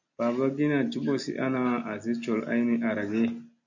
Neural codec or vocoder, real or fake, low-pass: none; real; 7.2 kHz